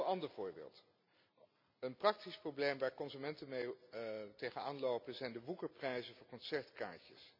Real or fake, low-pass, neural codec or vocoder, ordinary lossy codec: real; 5.4 kHz; none; MP3, 48 kbps